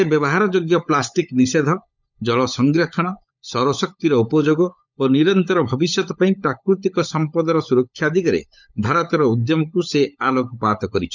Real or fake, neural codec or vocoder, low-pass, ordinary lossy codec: fake; codec, 16 kHz, 16 kbps, FunCodec, trained on LibriTTS, 50 frames a second; 7.2 kHz; none